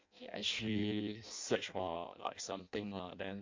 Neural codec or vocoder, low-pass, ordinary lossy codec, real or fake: codec, 16 kHz in and 24 kHz out, 0.6 kbps, FireRedTTS-2 codec; 7.2 kHz; MP3, 64 kbps; fake